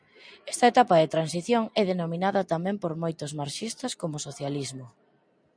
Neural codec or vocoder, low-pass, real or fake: none; 9.9 kHz; real